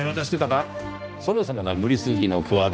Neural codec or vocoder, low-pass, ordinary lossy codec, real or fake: codec, 16 kHz, 1 kbps, X-Codec, HuBERT features, trained on general audio; none; none; fake